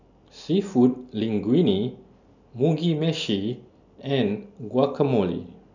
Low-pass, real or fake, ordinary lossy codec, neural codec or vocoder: 7.2 kHz; real; none; none